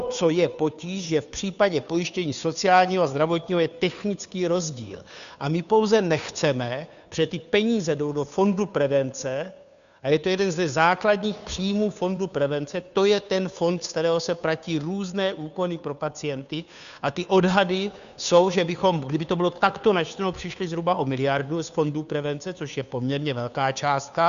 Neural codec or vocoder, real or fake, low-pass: codec, 16 kHz, 2 kbps, FunCodec, trained on Chinese and English, 25 frames a second; fake; 7.2 kHz